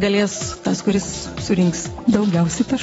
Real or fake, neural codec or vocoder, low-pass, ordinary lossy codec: fake; vocoder, 44.1 kHz, 128 mel bands every 256 samples, BigVGAN v2; 19.8 kHz; AAC, 24 kbps